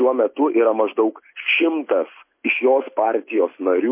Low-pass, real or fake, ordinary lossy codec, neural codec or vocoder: 3.6 kHz; real; MP3, 24 kbps; none